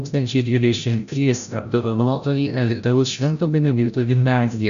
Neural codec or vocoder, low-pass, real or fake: codec, 16 kHz, 0.5 kbps, FreqCodec, larger model; 7.2 kHz; fake